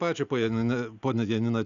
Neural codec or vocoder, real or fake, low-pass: none; real; 7.2 kHz